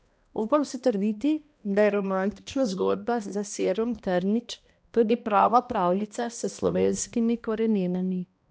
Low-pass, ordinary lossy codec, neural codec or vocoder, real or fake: none; none; codec, 16 kHz, 1 kbps, X-Codec, HuBERT features, trained on balanced general audio; fake